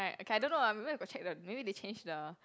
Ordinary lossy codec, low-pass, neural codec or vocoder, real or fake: none; none; none; real